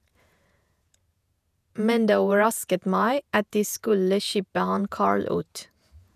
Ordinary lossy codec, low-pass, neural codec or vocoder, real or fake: none; 14.4 kHz; vocoder, 48 kHz, 128 mel bands, Vocos; fake